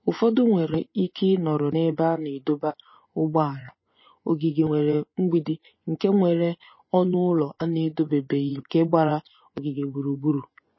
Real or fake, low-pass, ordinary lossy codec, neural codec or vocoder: fake; 7.2 kHz; MP3, 24 kbps; vocoder, 24 kHz, 100 mel bands, Vocos